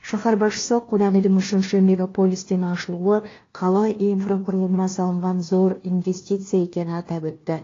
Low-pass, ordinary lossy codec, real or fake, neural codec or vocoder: 7.2 kHz; AAC, 32 kbps; fake; codec, 16 kHz, 1 kbps, FunCodec, trained on LibriTTS, 50 frames a second